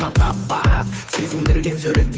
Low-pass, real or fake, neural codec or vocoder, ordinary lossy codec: none; fake; codec, 16 kHz, 2 kbps, FunCodec, trained on Chinese and English, 25 frames a second; none